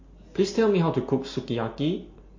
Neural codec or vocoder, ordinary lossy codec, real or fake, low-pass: none; MP3, 32 kbps; real; 7.2 kHz